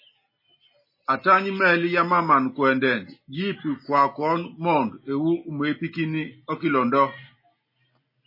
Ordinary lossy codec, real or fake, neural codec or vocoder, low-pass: MP3, 24 kbps; real; none; 5.4 kHz